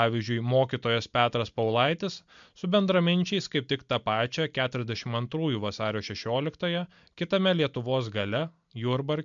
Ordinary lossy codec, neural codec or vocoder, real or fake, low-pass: MP3, 64 kbps; none; real; 7.2 kHz